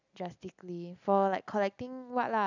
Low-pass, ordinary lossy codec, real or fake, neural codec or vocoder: 7.2 kHz; none; real; none